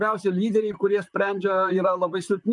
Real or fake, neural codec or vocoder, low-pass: fake; vocoder, 44.1 kHz, 128 mel bands, Pupu-Vocoder; 10.8 kHz